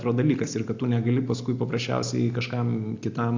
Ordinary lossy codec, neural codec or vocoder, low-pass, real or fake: AAC, 48 kbps; none; 7.2 kHz; real